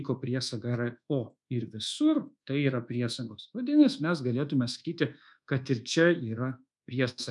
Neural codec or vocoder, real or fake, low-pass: codec, 24 kHz, 1.2 kbps, DualCodec; fake; 10.8 kHz